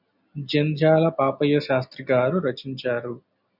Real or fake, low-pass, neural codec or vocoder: real; 5.4 kHz; none